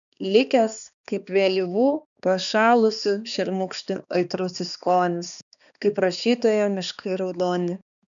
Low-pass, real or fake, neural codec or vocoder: 7.2 kHz; fake; codec, 16 kHz, 2 kbps, X-Codec, HuBERT features, trained on balanced general audio